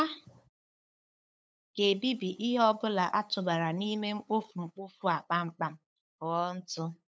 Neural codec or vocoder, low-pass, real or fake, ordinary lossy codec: codec, 16 kHz, 8 kbps, FunCodec, trained on LibriTTS, 25 frames a second; none; fake; none